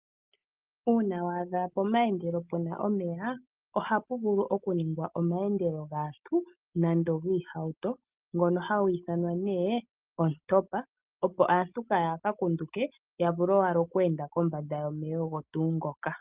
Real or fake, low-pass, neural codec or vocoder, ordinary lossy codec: real; 3.6 kHz; none; Opus, 24 kbps